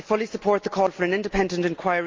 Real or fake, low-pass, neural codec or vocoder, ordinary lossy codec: real; 7.2 kHz; none; Opus, 32 kbps